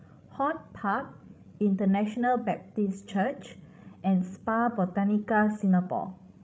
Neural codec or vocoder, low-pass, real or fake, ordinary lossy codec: codec, 16 kHz, 16 kbps, FreqCodec, larger model; none; fake; none